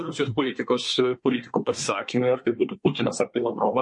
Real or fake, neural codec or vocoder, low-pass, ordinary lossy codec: fake; codec, 24 kHz, 1 kbps, SNAC; 10.8 kHz; MP3, 48 kbps